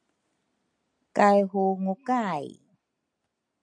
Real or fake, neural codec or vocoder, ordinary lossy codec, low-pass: real; none; AAC, 48 kbps; 9.9 kHz